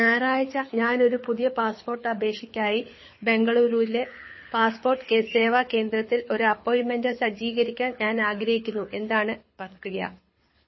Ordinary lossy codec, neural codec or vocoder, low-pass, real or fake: MP3, 24 kbps; codec, 24 kHz, 6 kbps, HILCodec; 7.2 kHz; fake